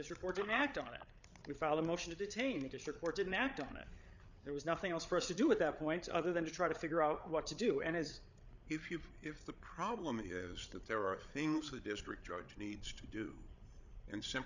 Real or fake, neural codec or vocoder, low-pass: fake; codec, 16 kHz, 8 kbps, FreqCodec, larger model; 7.2 kHz